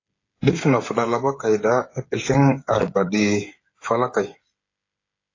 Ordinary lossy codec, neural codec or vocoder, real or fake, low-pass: AAC, 32 kbps; codec, 16 kHz, 8 kbps, FreqCodec, smaller model; fake; 7.2 kHz